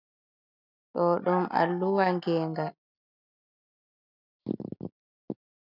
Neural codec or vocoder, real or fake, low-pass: none; real; 5.4 kHz